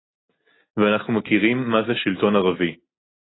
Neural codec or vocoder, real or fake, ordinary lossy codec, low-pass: none; real; AAC, 16 kbps; 7.2 kHz